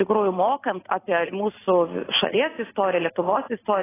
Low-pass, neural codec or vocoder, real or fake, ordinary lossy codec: 3.6 kHz; none; real; AAC, 16 kbps